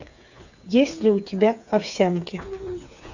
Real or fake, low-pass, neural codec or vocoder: fake; 7.2 kHz; codec, 16 kHz, 8 kbps, FreqCodec, smaller model